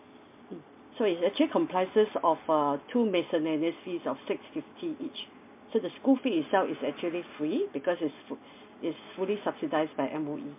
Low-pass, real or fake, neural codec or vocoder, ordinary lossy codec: 3.6 kHz; real; none; MP3, 32 kbps